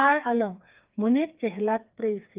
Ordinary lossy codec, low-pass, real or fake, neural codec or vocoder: Opus, 24 kbps; 3.6 kHz; fake; codec, 16 kHz, 4 kbps, FreqCodec, smaller model